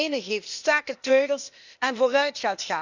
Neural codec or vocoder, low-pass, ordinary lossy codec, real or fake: codec, 16 kHz, 0.8 kbps, ZipCodec; 7.2 kHz; none; fake